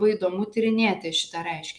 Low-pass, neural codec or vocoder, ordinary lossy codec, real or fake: 9.9 kHz; none; MP3, 96 kbps; real